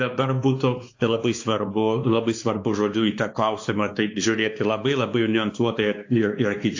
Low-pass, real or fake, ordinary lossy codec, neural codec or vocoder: 7.2 kHz; fake; AAC, 48 kbps; codec, 16 kHz, 2 kbps, X-Codec, WavLM features, trained on Multilingual LibriSpeech